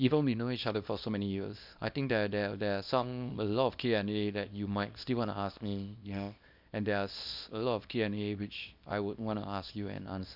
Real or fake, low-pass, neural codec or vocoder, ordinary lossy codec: fake; 5.4 kHz; codec, 24 kHz, 0.9 kbps, WavTokenizer, small release; none